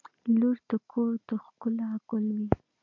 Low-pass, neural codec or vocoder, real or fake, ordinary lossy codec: 7.2 kHz; none; real; MP3, 64 kbps